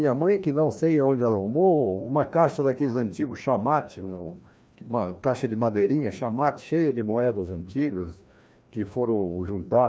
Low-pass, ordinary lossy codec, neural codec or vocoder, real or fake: none; none; codec, 16 kHz, 1 kbps, FreqCodec, larger model; fake